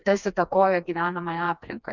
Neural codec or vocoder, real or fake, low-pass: codec, 16 kHz, 2 kbps, FreqCodec, smaller model; fake; 7.2 kHz